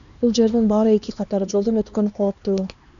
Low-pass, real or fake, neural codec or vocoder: 7.2 kHz; fake; codec, 16 kHz, 2 kbps, X-Codec, HuBERT features, trained on LibriSpeech